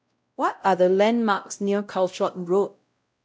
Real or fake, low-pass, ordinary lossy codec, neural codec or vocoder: fake; none; none; codec, 16 kHz, 0.5 kbps, X-Codec, WavLM features, trained on Multilingual LibriSpeech